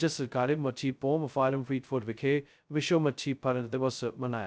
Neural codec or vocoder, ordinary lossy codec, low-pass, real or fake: codec, 16 kHz, 0.2 kbps, FocalCodec; none; none; fake